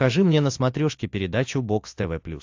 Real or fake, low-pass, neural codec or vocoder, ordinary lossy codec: real; 7.2 kHz; none; AAC, 48 kbps